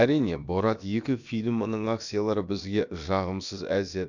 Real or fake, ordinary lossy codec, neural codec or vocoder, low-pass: fake; none; codec, 16 kHz, about 1 kbps, DyCAST, with the encoder's durations; 7.2 kHz